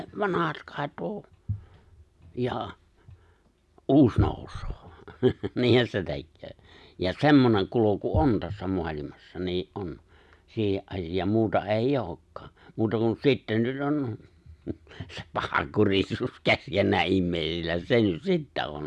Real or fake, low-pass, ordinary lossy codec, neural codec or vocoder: real; none; none; none